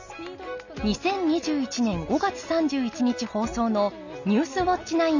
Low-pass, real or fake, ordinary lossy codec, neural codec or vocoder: 7.2 kHz; real; none; none